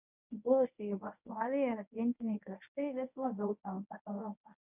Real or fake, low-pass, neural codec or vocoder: fake; 3.6 kHz; codec, 24 kHz, 0.9 kbps, WavTokenizer, medium speech release version 1